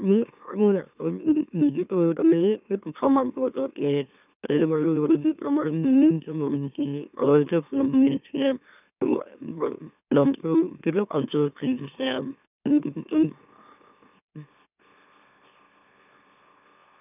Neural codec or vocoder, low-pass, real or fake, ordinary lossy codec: autoencoder, 44.1 kHz, a latent of 192 numbers a frame, MeloTTS; 3.6 kHz; fake; none